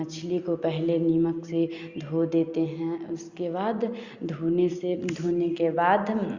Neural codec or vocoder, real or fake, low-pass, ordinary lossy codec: none; real; 7.2 kHz; Opus, 64 kbps